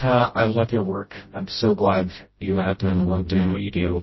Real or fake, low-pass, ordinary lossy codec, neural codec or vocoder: fake; 7.2 kHz; MP3, 24 kbps; codec, 16 kHz, 0.5 kbps, FreqCodec, smaller model